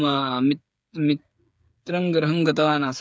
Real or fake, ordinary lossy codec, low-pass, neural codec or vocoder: fake; none; none; codec, 16 kHz, 8 kbps, FreqCodec, smaller model